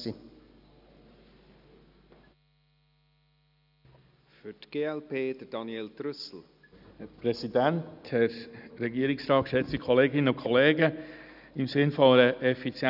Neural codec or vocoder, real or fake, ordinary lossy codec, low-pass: none; real; none; 5.4 kHz